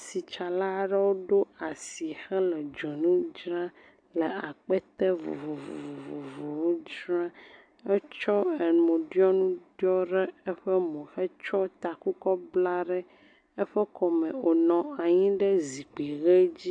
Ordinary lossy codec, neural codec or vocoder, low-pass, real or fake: AAC, 64 kbps; none; 9.9 kHz; real